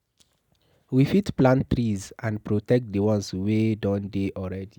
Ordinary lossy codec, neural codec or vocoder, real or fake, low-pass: none; none; real; 19.8 kHz